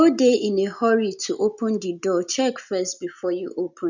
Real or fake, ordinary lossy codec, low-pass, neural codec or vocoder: real; none; 7.2 kHz; none